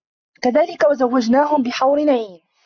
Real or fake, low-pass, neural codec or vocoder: real; 7.2 kHz; none